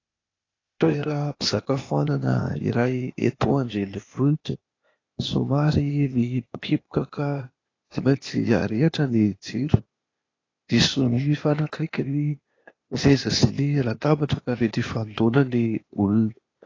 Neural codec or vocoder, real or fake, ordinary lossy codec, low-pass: codec, 16 kHz, 0.8 kbps, ZipCodec; fake; AAC, 32 kbps; 7.2 kHz